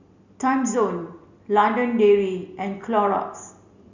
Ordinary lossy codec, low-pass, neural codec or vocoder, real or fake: Opus, 64 kbps; 7.2 kHz; none; real